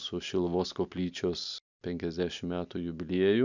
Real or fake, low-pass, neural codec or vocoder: real; 7.2 kHz; none